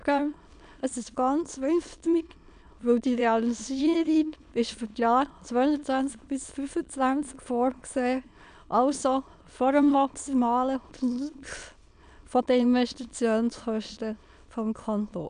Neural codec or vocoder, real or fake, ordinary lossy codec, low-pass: autoencoder, 22.05 kHz, a latent of 192 numbers a frame, VITS, trained on many speakers; fake; none; 9.9 kHz